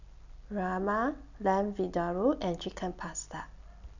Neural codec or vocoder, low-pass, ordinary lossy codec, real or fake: none; 7.2 kHz; none; real